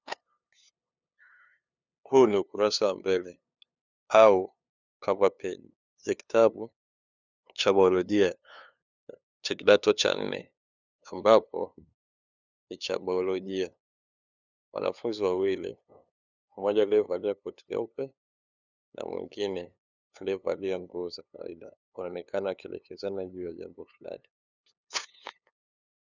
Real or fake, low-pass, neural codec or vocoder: fake; 7.2 kHz; codec, 16 kHz, 2 kbps, FunCodec, trained on LibriTTS, 25 frames a second